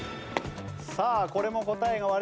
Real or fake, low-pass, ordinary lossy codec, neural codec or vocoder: real; none; none; none